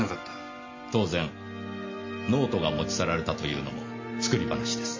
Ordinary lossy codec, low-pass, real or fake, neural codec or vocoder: MP3, 32 kbps; 7.2 kHz; real; none